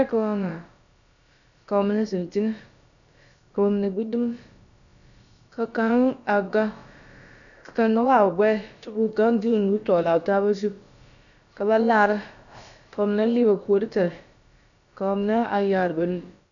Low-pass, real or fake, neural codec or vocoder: 7.2 kHz; fake; codec, 16 kHz, about 1 kbps, DyCAST, with the encoder's durations